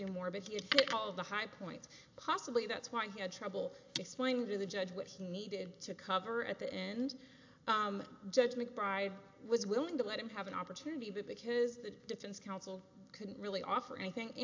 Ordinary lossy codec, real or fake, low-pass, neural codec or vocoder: AAC, 48 kbps; real; 7.2 kHz; none